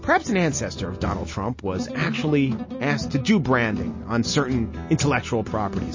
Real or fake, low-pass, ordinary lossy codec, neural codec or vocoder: real; 7.2 kHz; MP3, 32 kbps; none